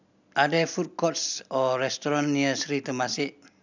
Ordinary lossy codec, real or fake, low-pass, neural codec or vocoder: none; real; 7.2 kHz; none